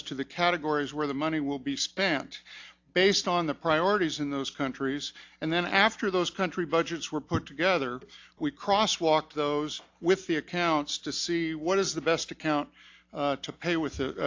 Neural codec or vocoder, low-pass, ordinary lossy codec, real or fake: none; 7.2 kHz; AAC, 48 kbps; real